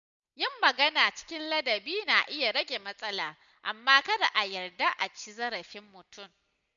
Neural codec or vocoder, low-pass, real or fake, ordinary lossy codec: none; 7.2 kHz; real; none